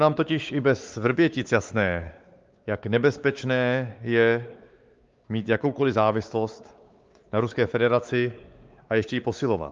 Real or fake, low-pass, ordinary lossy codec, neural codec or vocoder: fake; 7.2 kHz; Opus, 32 kbps; codec, 16 kHz, 4 kbps, X-Codec, WavLM features, trained on Multilingual LibriSpeech